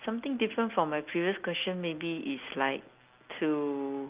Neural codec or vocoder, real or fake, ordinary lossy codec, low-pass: none; real; Opus, 32 kbps; 3.6 kHz